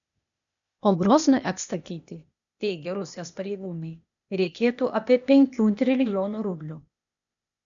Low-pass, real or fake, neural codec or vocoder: 7.2 kHz; fake; codec, 16 kHz, 0.8 kbps, ZipCodec